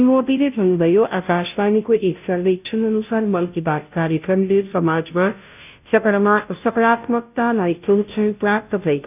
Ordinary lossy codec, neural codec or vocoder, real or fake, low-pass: none; codec, 16 kHz, 0.5 kbps, FunCodec, trained on Chinese and English, 25 frames a second; fake; 3.6 kHz